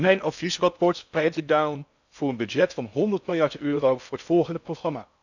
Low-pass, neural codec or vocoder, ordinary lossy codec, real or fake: 7.2 kHz; codec, 16 kHz in and 24 kHz out, 0.6 kbps, FocalCodec, streaming, 4096 codes; none; fake